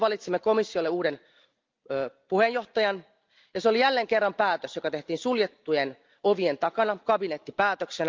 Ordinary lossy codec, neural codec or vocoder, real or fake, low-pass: Opus, 24 kbps; none; real; 7.2 kHz